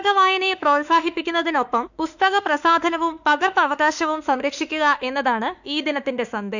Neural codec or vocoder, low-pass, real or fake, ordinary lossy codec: autoencoder, 48 kHz, 32 numbers a frame, DAC-VAE, trained on Japanese speech; 7.2 kHz; fake; none